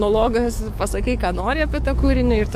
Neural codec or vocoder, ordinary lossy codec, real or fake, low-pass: codec, 44.1 kHz, 7.8 kbps, DAC; MP3, 64 kbps; fake; 14.4 kHz